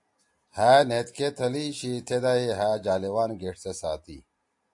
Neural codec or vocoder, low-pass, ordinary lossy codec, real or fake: none; 10.8 kHz; AAC, 64 kbps; real